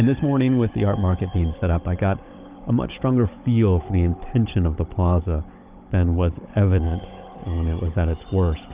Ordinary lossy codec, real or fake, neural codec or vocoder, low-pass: Opus, 32 kbps; fake; codec, 16 kHz, 16 kbps, FunCodec, trained on Chinese and English, 50 frames a second; 3.6 kHz